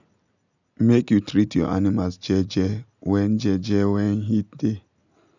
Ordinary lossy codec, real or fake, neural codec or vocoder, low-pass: none; real; none; 7.2 kHz